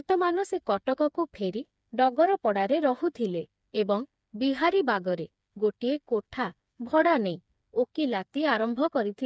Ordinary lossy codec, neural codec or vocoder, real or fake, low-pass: none; codec, 16 kHz, 4 kbps, FreqCodec, smaller model; fake; none